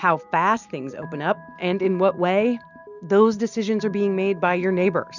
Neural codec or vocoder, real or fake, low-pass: none; real; 7.2 kHz